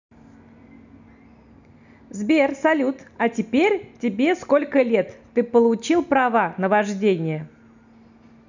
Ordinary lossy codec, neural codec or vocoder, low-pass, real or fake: none; none; 7.2 kHz; real